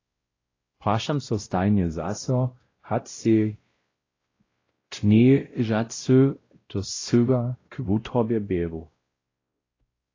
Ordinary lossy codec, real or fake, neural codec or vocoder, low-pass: AAC, 32 kbps; fake; codec, 16 kHz, 0.5 kbps, X-Codec, WavLM features, trained on Multilingual LibriSpeech; 7.2 kHz